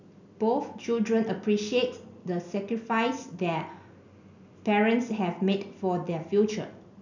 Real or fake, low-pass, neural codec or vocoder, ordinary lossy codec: real; 7.2 kHz; none; none